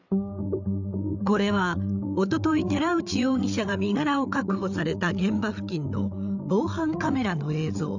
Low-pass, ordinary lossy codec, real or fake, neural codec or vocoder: 7.2 kHz; none; fake; codec, 16 kHz, 8 kbps, FreqCodec, larger model